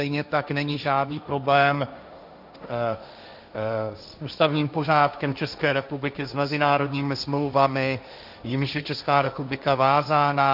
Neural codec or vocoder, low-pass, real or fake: codec, 16 kHz, 1.1 kbps, Voila-Tokenizer; 5.4 kHz; fake